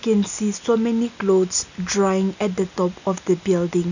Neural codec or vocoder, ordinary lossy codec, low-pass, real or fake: none; none; 7.2 kHz; real